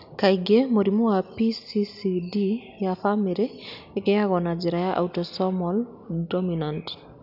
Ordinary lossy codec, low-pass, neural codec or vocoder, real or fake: none; 5.4 kHz; none; real